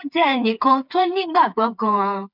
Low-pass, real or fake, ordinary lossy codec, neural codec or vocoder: 5.4 kHz; fake; none; codec, 16 kHz, 4 kbps, FreqCodec, smaller model